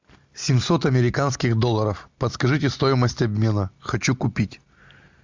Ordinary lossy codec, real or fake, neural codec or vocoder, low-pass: MP3, 64 kbps; real; none; 7.2 kHz